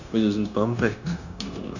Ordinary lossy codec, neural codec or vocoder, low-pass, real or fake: none; codec, 16 kHz, 0.9 kbps, LongCat-Audio-Codec; 7.2 kHz; fake